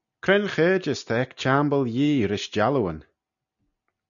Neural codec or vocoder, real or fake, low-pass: none; real; 7.2 kHz